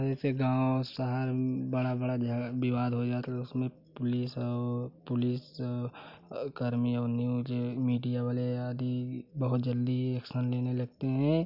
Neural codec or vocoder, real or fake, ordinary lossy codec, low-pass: none; real; none; 5.4 kHz